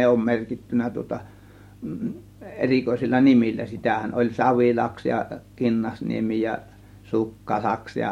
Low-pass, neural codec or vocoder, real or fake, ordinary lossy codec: 19.8 kHz; none; real; MP3, 64 kbps